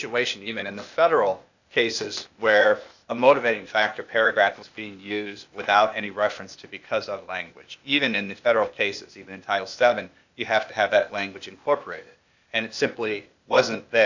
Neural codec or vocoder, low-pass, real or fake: codec, 16 kHz, 0.8 kbps, ZipCodec; 7.2 kHz; fake